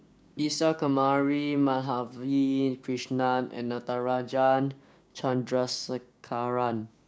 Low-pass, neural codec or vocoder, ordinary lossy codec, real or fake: none; codec, 16 kHz, 6 kbps, DAC; none; fake